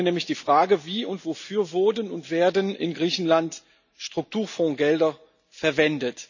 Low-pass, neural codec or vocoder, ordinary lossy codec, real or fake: 7.2 kHz; none; none; real